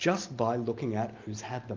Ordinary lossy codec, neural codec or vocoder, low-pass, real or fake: Opus, 24 kbps; none; 7.2 kHz; real